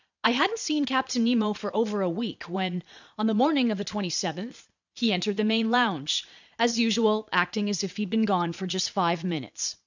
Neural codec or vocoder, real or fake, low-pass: vocoder, 22.05 kHz, 80 mel bands, Vocos; fake; 7.2 kHz